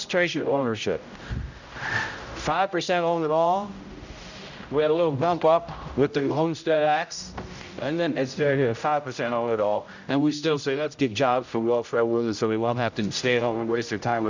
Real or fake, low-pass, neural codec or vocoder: fake; 7.2 kHz; codec, 16 kHz, 0.5 kbps, X-Codec, HuBERT features, trained on general audio